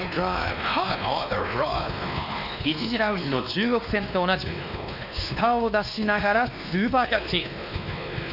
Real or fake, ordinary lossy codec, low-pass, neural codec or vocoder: fake; none; 5.4 kHz; codec, 16 kHz, 2 kbps, X-Codec, WavLM features, trained on Multilingual LibriSpeech